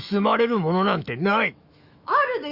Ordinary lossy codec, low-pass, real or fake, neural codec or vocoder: Opus, 64 kbps; 5.4 kHz; fake; autoencoder, 48 kHz, 128 numbers a frame, DAC-VAE, trained on Japanese speech